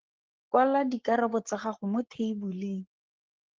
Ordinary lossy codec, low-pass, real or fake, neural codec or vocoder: Opus, 16 kbps; 7.2 kHz; fake; codec, 44.1 kHz, 7.8 kbps, Pupu-Codec